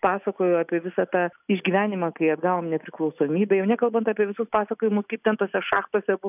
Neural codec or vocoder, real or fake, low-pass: none; real; 3.6 kHz